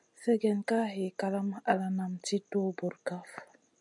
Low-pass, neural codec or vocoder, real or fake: 10.8 kHz; none; real